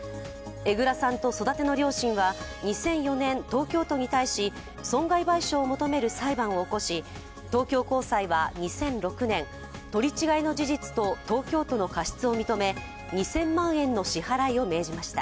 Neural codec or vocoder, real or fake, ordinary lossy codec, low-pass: none; real; none; none